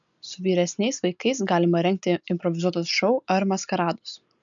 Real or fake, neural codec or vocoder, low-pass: real; none; 7.2 kHz